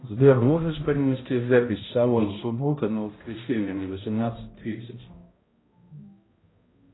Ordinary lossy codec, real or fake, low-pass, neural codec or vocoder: AAC, 16 kbps; fake; 7.2 kHz; codec, 16 kHz, 0.5 kbps, X-Codec, HuBERT features, trained on balanced general audio